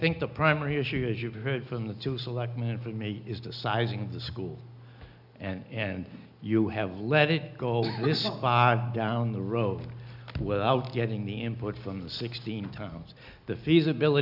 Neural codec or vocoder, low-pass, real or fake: none; 5.4 kHz; real